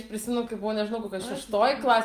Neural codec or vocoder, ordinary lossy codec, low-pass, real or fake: none; Opus, 32 kbps; 14.4 kHz; real